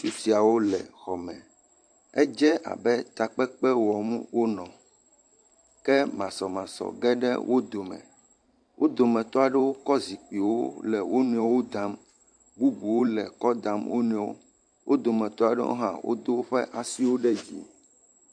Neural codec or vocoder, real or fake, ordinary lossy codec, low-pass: vocoder, 44.1 kHz, 128 mel bands every 512 samples, BigVGAN v2; fake; AAC, 64 kbps; 9.9 kHz